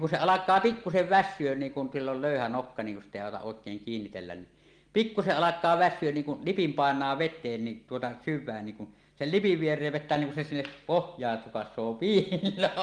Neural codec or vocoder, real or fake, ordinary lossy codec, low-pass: none; real; Opus, 24 kbps; 9.9 kHz